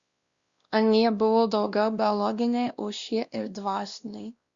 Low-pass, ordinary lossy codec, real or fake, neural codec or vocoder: 7.2 kHz; Opus, 64 kbps; fake; codec, 16 kHz, 1 kbps, X-Codec, WavLM features, trained on Multilingual LibriSpeech